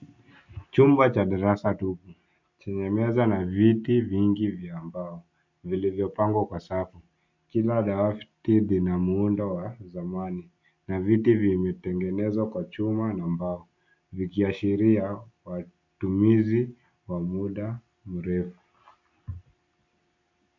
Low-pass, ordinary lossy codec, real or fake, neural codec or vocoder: 7.2 kHz; MP3, 64 kbps; real; none